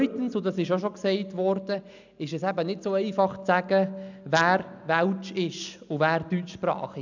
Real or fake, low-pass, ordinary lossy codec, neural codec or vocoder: real; 7.2 kHz; none; none